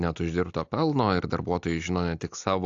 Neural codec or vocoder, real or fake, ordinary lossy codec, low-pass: none; real; AAC, 64 kbps; 7.2 kHz